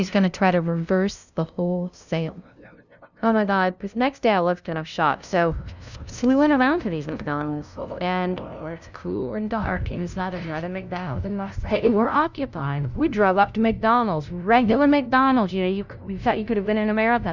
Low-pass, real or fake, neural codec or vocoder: 7.2 kHz; fake; codec, 16 kHz, 0.5 kbps, FunCodec, trained on LibriTTS, 25 frames a second